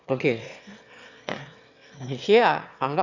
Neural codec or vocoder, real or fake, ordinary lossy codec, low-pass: autoencoder, 22.05 kHz, a latent of 192 numbers a frame, VITS, trained on one speaker; fake; none; 7.2 kHz